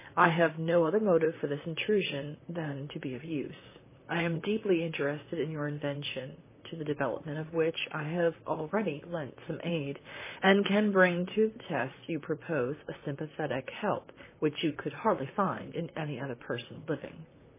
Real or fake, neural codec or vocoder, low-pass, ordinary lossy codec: fake; vocoder, 44.1 kHz, 128 mel bands, Pupu-Vocoder; 3.6 kHz; MP3, 16 kbps